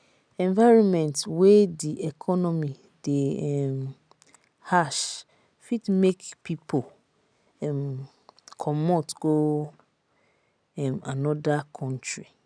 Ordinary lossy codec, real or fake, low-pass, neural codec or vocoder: none; real; 9.9 kHz; none